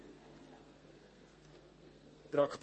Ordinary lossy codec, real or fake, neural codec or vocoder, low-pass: MP3, 32 kbps; fake; codec, 32 kHz, 1.9 kbps, SNAC; 10.8 kHz